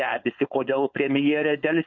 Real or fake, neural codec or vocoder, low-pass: fake; codec, 16 kHz, 4.8 kbps, FACodec; 7.2 kHz